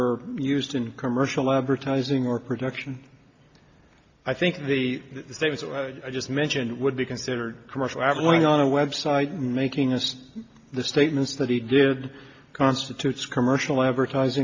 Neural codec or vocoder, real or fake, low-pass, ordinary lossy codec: none; real; 7.2 kHz; AAC, 48 kbps